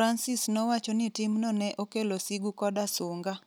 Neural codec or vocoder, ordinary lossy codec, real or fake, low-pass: none; none; real; none